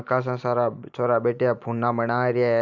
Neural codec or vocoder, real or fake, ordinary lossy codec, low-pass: none; real; none; 7.2 kHz